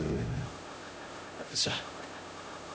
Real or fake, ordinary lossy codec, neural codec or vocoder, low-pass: fake; none; codec, 16 kHz, 0.5 kbps, X-Codec, HuBERT features, trained on LibriSpeech; none